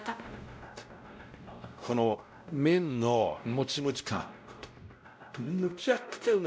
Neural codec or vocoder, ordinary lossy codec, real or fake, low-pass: codec, 16 kHz, 0.5 kbps, X-Codec, WavLM features, trained on Multilingual LibriSpeech; none; fake; none